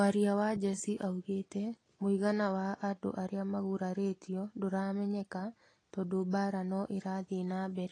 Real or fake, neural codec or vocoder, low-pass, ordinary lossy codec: real; none; 9.9 kHz; AAC, 32 kbps